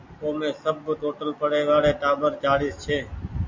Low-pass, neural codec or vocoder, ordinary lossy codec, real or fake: 7.2 kHz; none; MP3, 48 kbps; real